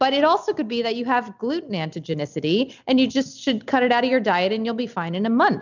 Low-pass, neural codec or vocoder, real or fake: 7.2 kHz; none; real